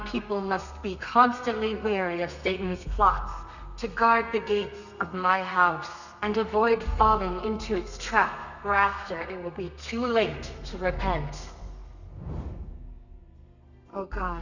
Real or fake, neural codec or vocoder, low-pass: fake; codec, 32 kHz, 1.9 kbps, SNAC; 7.2 kHz